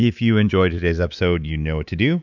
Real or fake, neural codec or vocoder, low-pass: fake; codec, 24 kHz, 3.1 kbps, DualCodec; 7.2 kHz